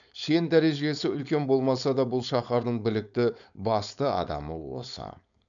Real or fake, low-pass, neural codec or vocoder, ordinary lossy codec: fake; 7.2 kHz; codec, 16 kHz, 4.8 kbps, FACodec; none